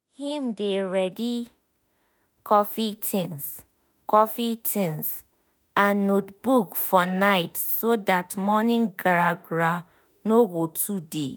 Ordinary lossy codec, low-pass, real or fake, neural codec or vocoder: none; none; fake; autoencoder, 48 kHz, 32 numbers a frame, DAC-VAE, trained on Japanese speech